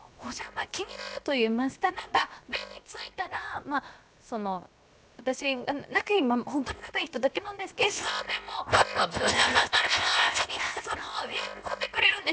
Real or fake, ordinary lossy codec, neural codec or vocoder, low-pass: fake; none; codec, 16 kHz, 0.7 kbps, FocalCodec; none